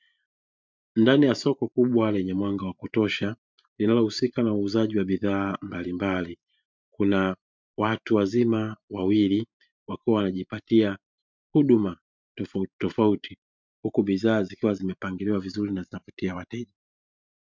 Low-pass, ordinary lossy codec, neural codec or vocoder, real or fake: 7.2 kHz; MP3, 64 kbps; vocoder, 44.1 kHz, 128 mel bands every 512 samples, BigVGAN v2; fake